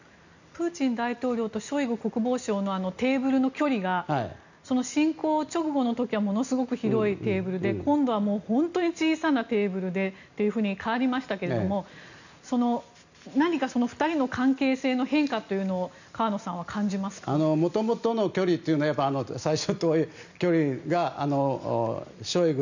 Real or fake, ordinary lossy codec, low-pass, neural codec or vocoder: real; none; 7.2 kHz; none